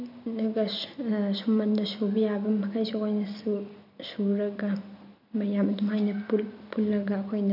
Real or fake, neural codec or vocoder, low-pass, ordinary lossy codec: real; none; 5.4 kHz; none